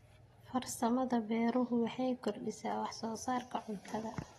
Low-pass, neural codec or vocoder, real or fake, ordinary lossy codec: 19.8 kHz; none; real; AAC, 32 kbps